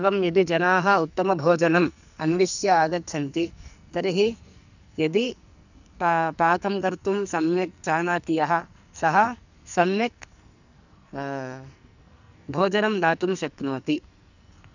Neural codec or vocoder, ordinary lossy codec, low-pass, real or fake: codec, 44.1 kHz, 2.6 kbps, SNAC; none; 7.2 kHz; fake